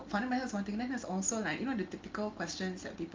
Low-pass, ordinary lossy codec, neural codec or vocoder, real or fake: 7.2 kHz; Opus, 32 kbps; none; real